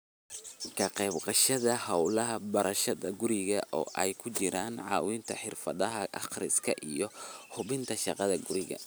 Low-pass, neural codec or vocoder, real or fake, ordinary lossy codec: none; none; real; none